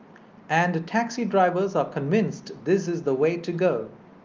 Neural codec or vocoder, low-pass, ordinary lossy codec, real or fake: none; 7.2 kHz; Opus, 32 kbps; real